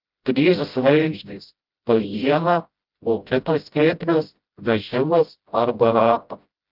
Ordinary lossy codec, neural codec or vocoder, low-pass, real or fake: Opus, 24 kbps; codec, 16 kHz, 0.5 kbps, FreqCodec, smaller model; 5.4 kHz; fake